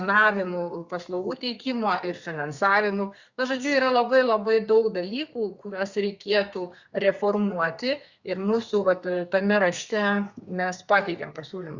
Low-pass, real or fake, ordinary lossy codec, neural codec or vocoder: 7.2 kHz; fake; Opus, 64 kbps; codec, 32 kHz, 1.9 kbps, SNAC